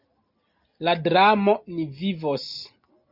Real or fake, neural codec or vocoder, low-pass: real; none; 5.4 kHz